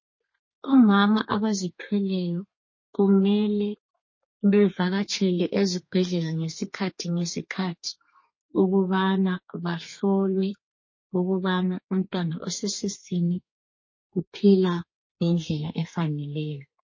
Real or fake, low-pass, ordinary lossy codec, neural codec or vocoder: fake; 7.2 kHz; MP3, 32 kbps; codec, 32 kHz, 1.9 kbps, SNAC